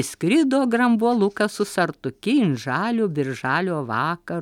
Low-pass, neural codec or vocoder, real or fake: 19.8 kHz; none; real